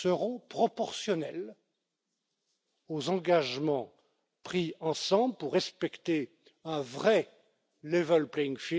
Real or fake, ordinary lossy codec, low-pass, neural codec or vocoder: real; none; none; none